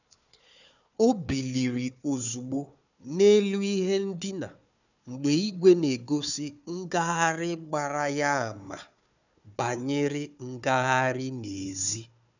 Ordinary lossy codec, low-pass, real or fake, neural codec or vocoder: none; 7.2 kHz; fake; codec, 16 kHz, 4 kbps, FunCodec, trained on Chinese and English, 50 frames a second